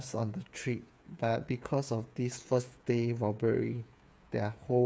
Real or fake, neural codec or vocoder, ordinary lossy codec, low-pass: fake; codec, 16 kHz, 8 kbps, FreqCodec, smaller model; none; none